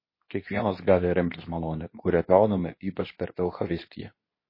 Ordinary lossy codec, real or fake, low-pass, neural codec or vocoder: MP3, 24 kbps; fake; 5.4 kHz; codec, 24 kHz, 0.9 kbps, WavTokenizer, medium speech release version 2